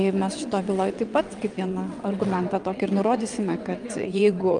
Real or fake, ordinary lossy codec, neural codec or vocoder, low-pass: fake; Opus, 32 kbps; vocoder, 22.05 kHz, 80 mel bands, WaveNeXt; 9.9 kHz